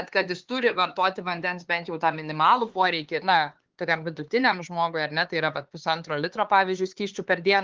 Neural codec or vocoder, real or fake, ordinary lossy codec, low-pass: codec, 16 kHz, 4 kbps, X-Codec, HuBERT features, trained on LibriSpeech; fake; Opus, 16 kbps; 7.2 kHz